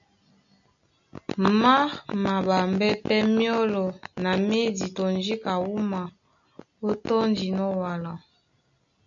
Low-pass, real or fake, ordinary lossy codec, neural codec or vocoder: 7.2 kHz; real; MP3, 96 kbps; none